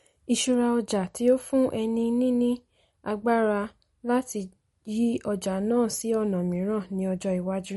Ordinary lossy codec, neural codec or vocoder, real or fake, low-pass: MP3, 48 kbps; none; real; 19.8 kHz